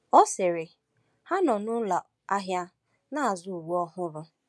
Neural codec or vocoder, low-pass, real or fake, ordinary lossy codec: none; none; real; none